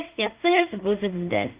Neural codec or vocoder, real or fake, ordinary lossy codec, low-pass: codec, 16 kHz in and 24 kHz out, 0.4 kbps, LongCat-Audio-Codec, two codebook decoder; fake; Opus, 32 kbps; 3.6 kHz